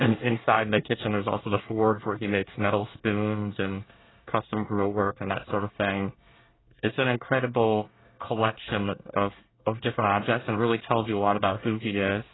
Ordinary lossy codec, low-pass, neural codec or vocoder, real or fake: AAC, 16 kbps; 7.2 kHz; codec, 24 kHz, 1 kbps, SNAC; fake